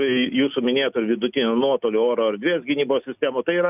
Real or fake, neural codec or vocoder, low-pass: real; none; 3.6 kHz